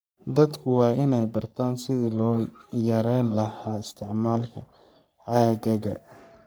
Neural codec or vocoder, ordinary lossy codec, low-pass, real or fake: codec, 44.1 kHz, 3.4 kbps, Pupu-Codec; none; none; fake